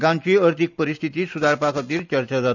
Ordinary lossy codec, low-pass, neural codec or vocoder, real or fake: none; 7.2 kHz; none; real